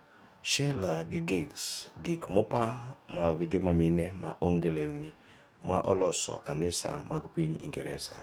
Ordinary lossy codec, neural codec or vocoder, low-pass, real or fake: none; codec, 44.1 kHz, 2.6 kbps, DAC; none; fake